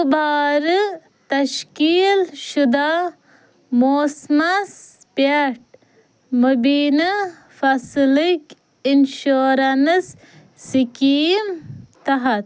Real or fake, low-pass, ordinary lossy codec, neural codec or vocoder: real; none; none; none